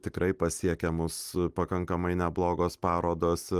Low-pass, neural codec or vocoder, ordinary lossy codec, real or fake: 14.4 kHz; none; Opus, 32 kbps; real